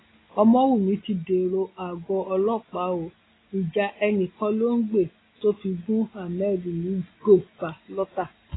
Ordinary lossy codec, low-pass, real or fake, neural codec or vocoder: AAC, 16 kbps; 7.2 kHz; real; none